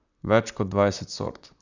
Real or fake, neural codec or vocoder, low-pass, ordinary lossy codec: fake; vocoder, 44.1 kHz, 128 mel bands, Pupu-Vocoder; 7.2 kHz; none